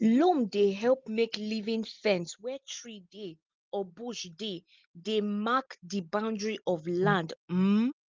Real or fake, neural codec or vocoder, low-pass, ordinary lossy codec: real; none; 7.2 kHz; Opus, 32 kbps